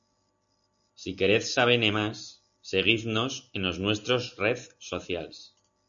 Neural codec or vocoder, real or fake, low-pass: none; real; 7.2 kHz